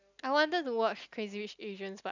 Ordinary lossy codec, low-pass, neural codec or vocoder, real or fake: none; 7.2 kHz; none; real